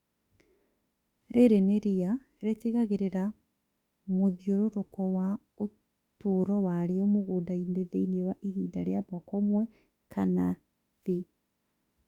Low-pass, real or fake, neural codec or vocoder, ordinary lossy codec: 19.8 kHz; fake; autoencoder, 48 kHz, 32 numbers a frame, DAC-VAE, trained on Japanese speech; Opus, 64 kbps